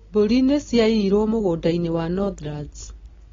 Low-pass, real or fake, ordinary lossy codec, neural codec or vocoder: 7.2 kHz; real; AAC, 24 kbps; none